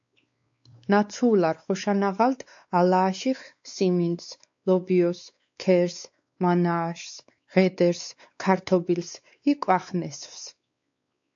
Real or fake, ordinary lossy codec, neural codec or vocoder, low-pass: fake; AAC, 64 kbps; codec, 16 kHz, 4 kbps, X-Codec, WavLM features, trained on Multilingual LibriSpeech; 7.2 kHz